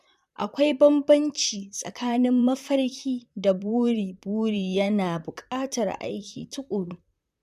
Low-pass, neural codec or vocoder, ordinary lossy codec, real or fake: 14.4 kHz; vocoder, 44.1 kHz, 128 mel bands, Pupu-Vocoder; MP3, 96 kbps; fake